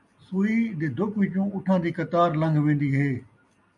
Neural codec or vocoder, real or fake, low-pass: none; real; 10.8 kHz